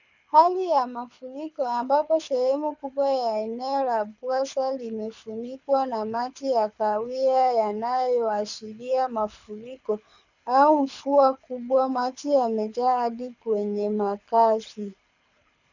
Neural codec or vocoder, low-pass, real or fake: codec, 24 kHz, 6 kbps, HILCodec; 7.2 kHz; fake